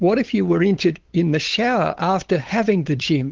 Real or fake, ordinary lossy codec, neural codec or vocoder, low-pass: fake; Opus, 16 kbps; codec, 16 kHz, 8 kbps, FunCodec, trained on LibriTTS, 25 frames a second; 7.2 kHz